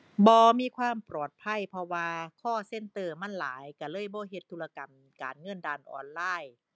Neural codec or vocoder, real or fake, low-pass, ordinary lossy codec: none; real; none; none